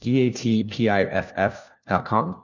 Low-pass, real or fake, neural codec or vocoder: 7.2 kHz; fake; codec, 16 kHz, 1 kbps, FunCodec, trained on LibriTTS, 50 frames a second